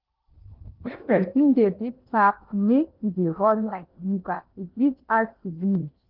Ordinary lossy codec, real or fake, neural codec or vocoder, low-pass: Opus, 32 kbps; fake; codec, 16 kHz in and 24 kHz out, 0.8 kbps, FocalCodec, streaming, 65536 codes; 5.4 kHz